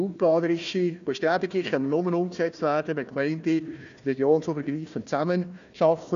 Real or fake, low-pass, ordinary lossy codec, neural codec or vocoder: fake; 7.2 kHz; none; codec, 16 kHz, 1 kbps, FunCodec, trained on Chinese and English, 50 frames a second